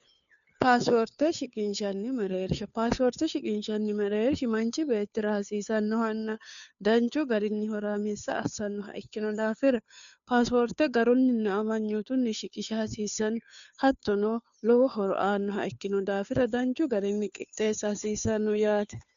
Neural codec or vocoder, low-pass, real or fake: codec, 16 kHz, 2 kbps, FunCodec, trained on Chinese and English, 25 frames a second; 7.2 kHz; fake